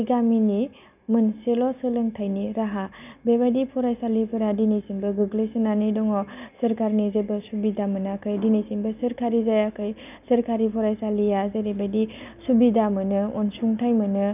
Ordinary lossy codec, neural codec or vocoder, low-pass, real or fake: none; none; 3.6 kHz; real